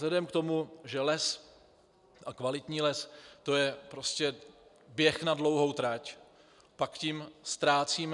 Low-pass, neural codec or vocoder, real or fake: 10.8 kHz; none; real